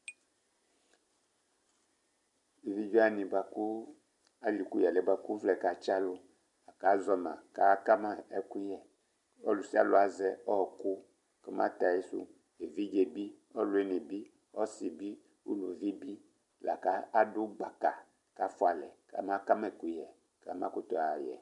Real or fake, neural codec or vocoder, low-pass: real; none; 10.8 kHz